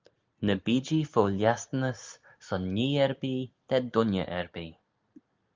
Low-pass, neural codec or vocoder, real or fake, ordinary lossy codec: 7.2 kHz; none; real; Opus, 24 kbps